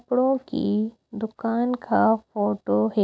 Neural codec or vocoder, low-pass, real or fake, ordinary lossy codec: none; none; real; none